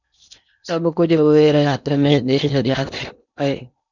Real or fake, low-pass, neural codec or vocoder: fake; 7.2 kHz; codec, 16 kHz in and 24 kHz out, 0.8 kbps, FocalCodec, streaming, 65536 codes